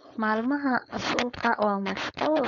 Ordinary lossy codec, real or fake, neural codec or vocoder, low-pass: none; fake; codec, 16 kHz, 4.8 kbps, FACodec; 7.2 kHz